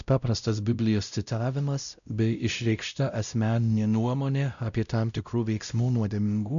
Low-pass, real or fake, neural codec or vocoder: 7.2 kHz; fake; codec, 16 kHz, 0.5 kbps, X-Codec, WavLM features, trained on Multilingual LibriSpeech